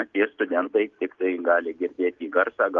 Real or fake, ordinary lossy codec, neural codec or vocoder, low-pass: real; Opus, 32 kbps; none; 7.2 kHz